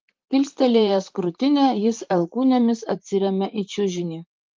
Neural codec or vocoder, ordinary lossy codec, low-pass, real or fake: vocoder, 44.1 kHz, 128 mel bands, Pupu-Vocoder; Opus, 32 kbps; 7.2 kHz; fake